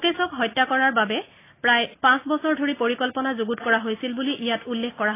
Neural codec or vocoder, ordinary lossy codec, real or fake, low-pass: none; AAC, 24 kbps; real; 3.6 kHz